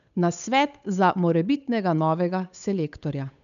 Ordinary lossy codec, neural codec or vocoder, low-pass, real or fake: none; codec, 16 kHz, 8 kbps, FunCodec, trained on Chinese and English, 25 frames a second; 7.2 kHz; fake